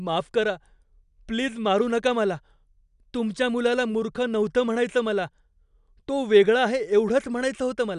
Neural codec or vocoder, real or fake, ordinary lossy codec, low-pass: none; real; none; 10.8 kHz